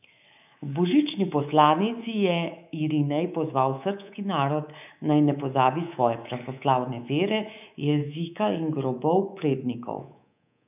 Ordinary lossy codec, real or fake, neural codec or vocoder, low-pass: none; fake; codec, 24 kHz, 3.1 kbps, DualCodec; 3.6 kHz